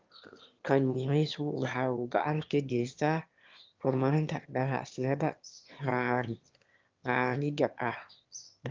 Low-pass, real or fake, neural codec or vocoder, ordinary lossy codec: 7.2 kHz; fake; autoencoder, 22.05 kHz, a latent of 192 numbers a frame, VITS, trained on one speaker; Opus, 32 kbps